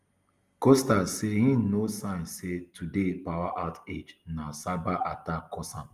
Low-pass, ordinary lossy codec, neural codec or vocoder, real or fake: 14.4 kHz; Opus, 32 kbps; none; real